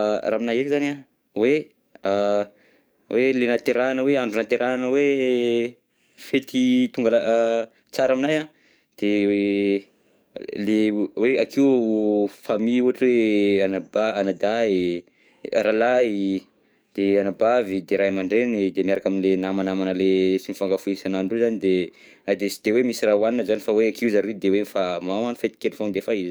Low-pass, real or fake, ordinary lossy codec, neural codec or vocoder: none; fake; none; codec, 44.1 kHz, 7.8 kbps, DAC